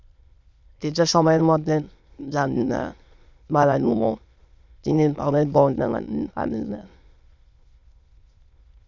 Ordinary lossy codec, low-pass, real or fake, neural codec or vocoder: Opus, 64 kbps; 7.2 kHz; fake; autoencoder, 22.05 kHz, a latent of 192 numbers a frame, VITS, trained on many speakers